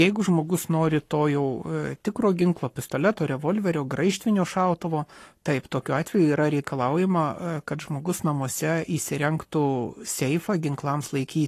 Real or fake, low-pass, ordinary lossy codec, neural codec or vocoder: fake; 14.4 kHz; AAC, 48 kbps; codec, 44.1 kHz, 7.8 kbps, Pupu-Codec